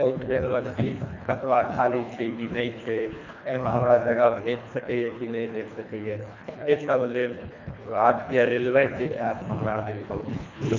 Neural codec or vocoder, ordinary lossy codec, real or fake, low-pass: codec, 24 kHz, 1.5 kbps, HILCodec; none; fake; 7.2 kHz